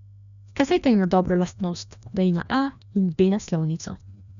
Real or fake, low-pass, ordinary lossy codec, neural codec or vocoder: fake; 7.2 kHz; none; codec, 16 kHz, 1 kbps, FreqCodec, larger model